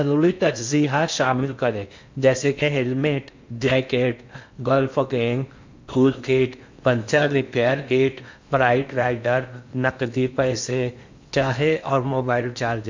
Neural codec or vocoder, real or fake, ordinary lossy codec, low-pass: codec, 16 kHz in and 24 kHz out, 0.6 kbps, FocalCodec, streaming, 4096 codes; fake; MP3, 64 kbps; 7.2 kHz